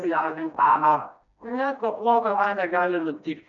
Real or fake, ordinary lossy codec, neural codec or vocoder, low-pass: fake; MP3, 96 kbps; codec, 16 kHz, 1 kbps, FreqCodec, smaller model; 7.2 kHz